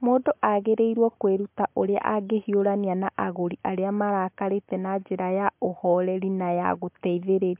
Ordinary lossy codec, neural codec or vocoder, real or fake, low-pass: MP3, 32 kbps; none; real; 3.6 kHz